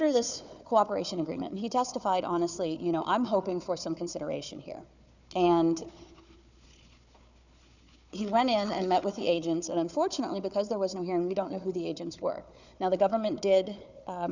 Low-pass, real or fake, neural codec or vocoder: 7.2 kHz; fake; codec, 16 kHz, 4 kbps, FunCodec, trained on Chinese and English, 50 frames a second